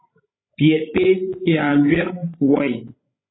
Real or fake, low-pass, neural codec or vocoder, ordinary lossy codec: fake; 7.2 kHz; codec, 16 kHz, 16 kbps, FreqCodec, larger model; AAC, 16 kbps